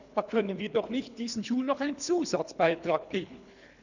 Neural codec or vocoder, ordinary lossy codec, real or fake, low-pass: codec, 24 kHz, 3 kbps, HILCodec; none; fake; 7.2 kHz